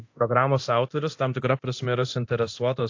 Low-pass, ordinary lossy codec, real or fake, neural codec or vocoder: 7.2 kHz; AAC, 48 kbps; fake; codec, 24 kHz, 0.9 kbps, DualCodec